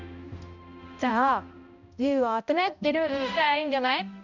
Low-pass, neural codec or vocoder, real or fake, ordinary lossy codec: 7.2 kHz; codec, 16 kHz, 0.5 kbps, X-Codec, HuBERT features, trained on balanced general audio; fake; none